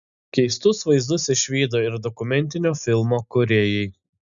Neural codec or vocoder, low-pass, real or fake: none; 7.2 kHz; real